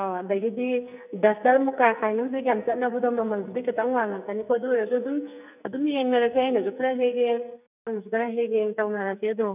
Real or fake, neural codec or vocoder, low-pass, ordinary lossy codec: fake; codec, 44.1 kHz, 2.6 kbps, SNAC; 3.6 kHz; none